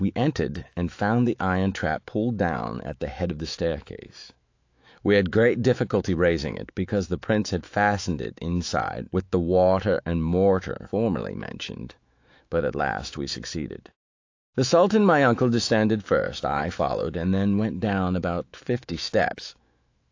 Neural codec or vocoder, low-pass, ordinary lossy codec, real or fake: autoencoder, 48 kHz, 128 numbers a frame, DAC-VAE, trained on Japanese speech; 7.2 kHz; AAC, 48 kbps; fake